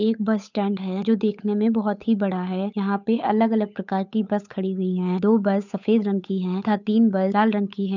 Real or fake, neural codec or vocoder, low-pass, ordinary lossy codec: fake; codec, 16 kHz, 8 kbps, FunCodec, trained on Chinese and English, 25 frames a second; 7.2 kHz; none